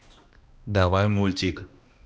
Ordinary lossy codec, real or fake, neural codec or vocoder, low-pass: none; fake; codec, 16 kHz, 1 kbps, X-Codec, HuBERT features, trained on balanced general audio; none